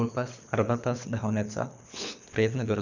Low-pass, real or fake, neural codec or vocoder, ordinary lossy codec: 7.2 kHz; fake; codec, 24 kHz, 3 kbps, HILCodec; none